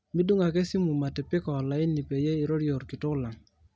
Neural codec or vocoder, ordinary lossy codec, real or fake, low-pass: none; none; real; none